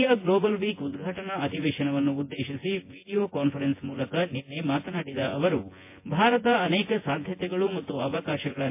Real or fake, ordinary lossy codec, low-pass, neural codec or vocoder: fake; MP3, 24 kbps; 3.6 kHz; vocoder, 24 kHz, 100 mel bands, Vocos